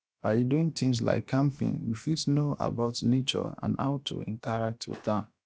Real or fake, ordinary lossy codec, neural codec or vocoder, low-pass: fake; none; codec, 16 kHz, about 1 kbps, DyCAST, with the encoder's durations; none